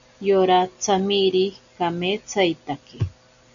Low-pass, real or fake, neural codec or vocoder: 7.2 kHz; real; none